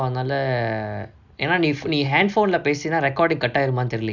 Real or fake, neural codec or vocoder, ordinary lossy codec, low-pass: real; none; none; 7.2 kHz